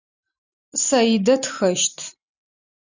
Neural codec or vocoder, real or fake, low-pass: none; real; 7.2 kHz